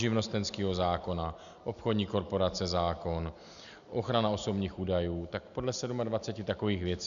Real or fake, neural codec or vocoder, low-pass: real; none; 7.2 kHz